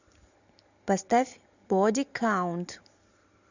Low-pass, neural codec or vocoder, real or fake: 7.2 kHz; vocoder, 44.1 kHz, 128 mel bands every 512 samples, BigVGAN v2; fake